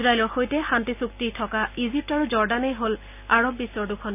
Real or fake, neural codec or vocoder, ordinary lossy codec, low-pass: real; none; none; 3.6 kHz